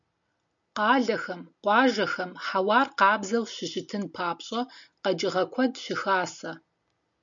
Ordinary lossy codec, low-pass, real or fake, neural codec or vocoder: MP3, 64 kbps; 7.2 kHz; real; none